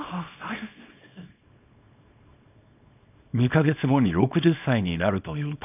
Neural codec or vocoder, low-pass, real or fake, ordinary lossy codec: codec, 24 kHz, 0.9 kbps, WavTokenizer, small release; 3.6 kHz; fake; none